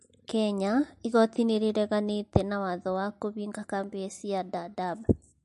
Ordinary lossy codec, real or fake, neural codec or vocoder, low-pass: MP3, 48 kbps; real; none; 14.4 kHz